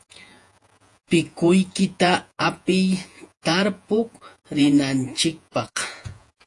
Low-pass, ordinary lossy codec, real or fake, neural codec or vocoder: 10.8 kHz; AAC, 64 kbps; fake; vocoder, 48 kHz, 128 mel bands, Vocos